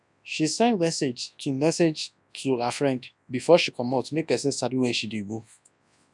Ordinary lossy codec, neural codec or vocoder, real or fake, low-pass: none; codec, 24 kHz, 0.9 kbps, WavTokenizer, large speech release; fake; 10.8 kHz